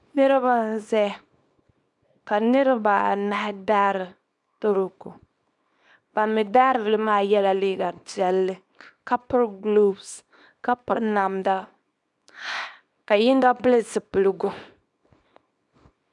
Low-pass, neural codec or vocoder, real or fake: 10.8 kHz; codec, 24 kHz, 0.9 kbps, WavTokenizer, small release; fake